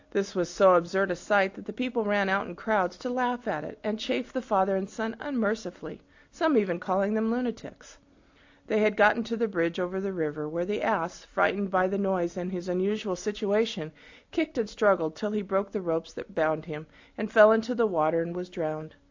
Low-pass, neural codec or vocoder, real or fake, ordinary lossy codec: 7.2 kHz; none; real; AAC, 48 kbps